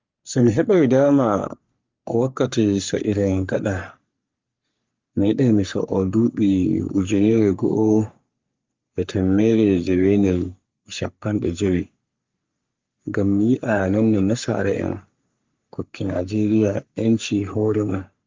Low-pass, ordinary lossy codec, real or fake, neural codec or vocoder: 7.2 kHz; Opus, 32 kbps; fake; codec, 44.1 kHz, 3.4 kbps, Pupu-Codec